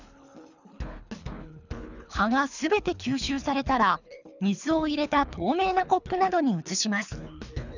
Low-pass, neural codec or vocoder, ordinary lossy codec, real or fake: 7.2 kHz; codec, 24 kHz, 3 kbps, HILCodec; none; fake